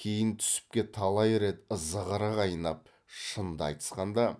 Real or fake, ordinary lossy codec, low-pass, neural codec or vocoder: real; none; none; none